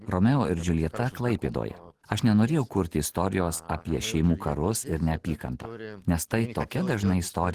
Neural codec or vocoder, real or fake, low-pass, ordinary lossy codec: none; real; 14.4 kHz; Opus, 16 kbps